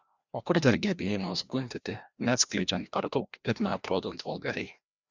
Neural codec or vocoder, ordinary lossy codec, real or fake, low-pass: codec, 16 kHz, 1 kbps, FreqCodec, larger model; Opus, 64 kbps; fake; 7.2 kHz